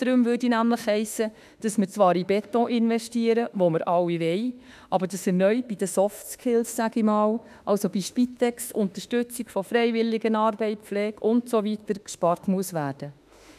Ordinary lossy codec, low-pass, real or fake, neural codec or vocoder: none; 14.4 kHz; fake; autoencoder, 48 kHz, 32 numbers a frame, DAC-VAE, trained on Japanese speech